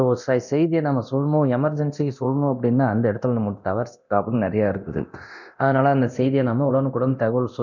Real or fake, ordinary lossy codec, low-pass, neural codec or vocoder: fake; none; 7.2 kHz; codec, 24 kHz, 0.9 kbps, DualCodec